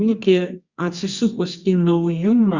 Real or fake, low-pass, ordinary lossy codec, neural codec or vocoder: fake; 7.2 kHz; Opus, 64 kbps; codec, 24 kHz, 0.9 kbps, WavTokenizer, medium music audio release